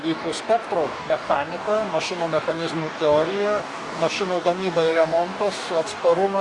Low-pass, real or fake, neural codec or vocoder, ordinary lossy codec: 10.8 kHz; fake; codec, 44.1 kHz, 2.6 kbps, DAC; Opus, 64 kbps